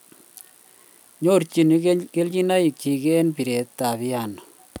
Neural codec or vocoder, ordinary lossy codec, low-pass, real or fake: none; none; none; real